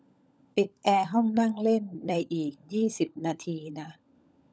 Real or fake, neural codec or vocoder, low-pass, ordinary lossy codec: fake; codec, 16 kHz, 16 kbps, FunCodec, trained on LibriTTS, 50 frames a second; none; none